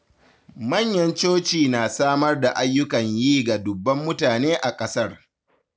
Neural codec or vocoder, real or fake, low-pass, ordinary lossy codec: none; real; none; none